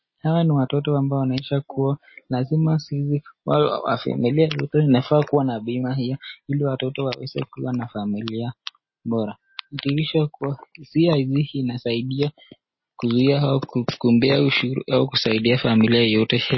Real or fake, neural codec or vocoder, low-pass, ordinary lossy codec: real; none; 7.2 kHz; MP3, 24 kbps